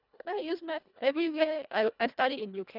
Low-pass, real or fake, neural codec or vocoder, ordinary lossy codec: 5.4 kHz; fake; codec, 24 kHz, 1.5 kbps, HILCodec; none